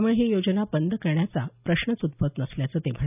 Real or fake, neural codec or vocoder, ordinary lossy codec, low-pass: real; none; none; 3.6 kHz